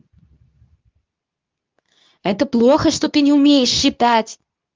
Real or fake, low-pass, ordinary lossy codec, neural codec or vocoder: fake; 7.2 kHz; Opus, 32 kbps; codec, 24 kHz, 0.9 kbps, WavTokenizer, medium speech release version 2